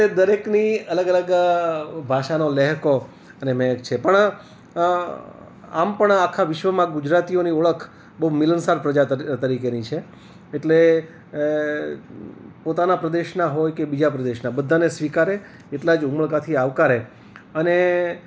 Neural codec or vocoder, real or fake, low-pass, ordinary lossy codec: none; real; none; none